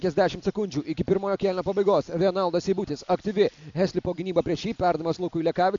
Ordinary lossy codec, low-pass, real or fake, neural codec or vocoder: MP3, 64 kbps; 7.2 kHz; real; none